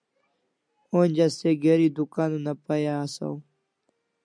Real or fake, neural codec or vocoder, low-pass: real; none; 9.9 kHz